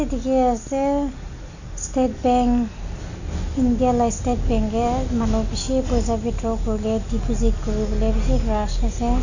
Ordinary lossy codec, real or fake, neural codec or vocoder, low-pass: none; real; none; 7.2 kHz